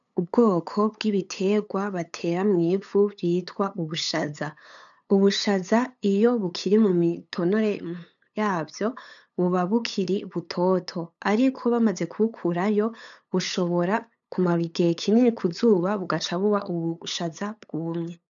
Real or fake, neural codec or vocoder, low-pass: fake; codec, 16 kHz, 8 kbps, FunCodec, trained on LibriTTS, 25 frames a second; 7.2 kHz